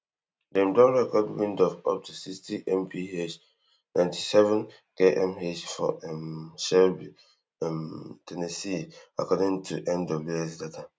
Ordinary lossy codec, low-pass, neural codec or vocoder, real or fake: none; none; none; real